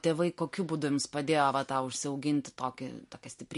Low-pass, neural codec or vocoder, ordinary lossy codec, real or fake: 14.4 kHz; none; MP3, 48 kbps; real